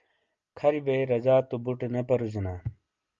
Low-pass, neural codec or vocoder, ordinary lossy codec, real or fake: 7.2 kHz; none; Opus, 32 kbps; real